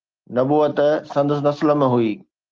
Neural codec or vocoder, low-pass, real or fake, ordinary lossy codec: none; 7.2 kHz; real; Opus, 24 kbps